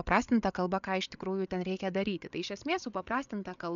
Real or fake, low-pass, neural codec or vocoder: fake; 7.2 kHz; codec, 16 kHz, 8 kbps, FreqCodec, larger model